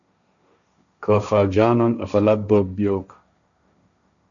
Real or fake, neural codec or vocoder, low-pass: fake; codec, 16 kHz, 1.1 kbps, Voila-Tokenizer; 7.2 kHz